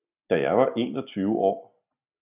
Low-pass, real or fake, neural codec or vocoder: 3.6 kHz; real; none